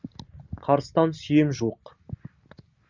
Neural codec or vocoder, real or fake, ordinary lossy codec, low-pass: none; real; Opus, 64 kbps; 7.2 kHz